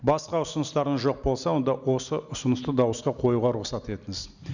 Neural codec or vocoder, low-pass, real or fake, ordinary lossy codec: none; 7.2 kHz; real; none